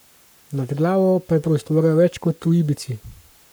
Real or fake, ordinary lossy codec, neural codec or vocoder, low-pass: fake; none; codec, 44.1 kHz, 7.8 kbps, Pupu-Codec; none